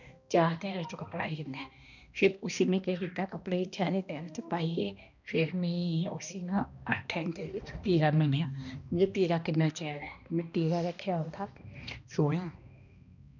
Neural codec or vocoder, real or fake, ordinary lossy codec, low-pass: codec, 16 kHz, 1 kbps, X-Codec, HuBERT features, trained on balanced general audio; fake; none; 7.2 kHz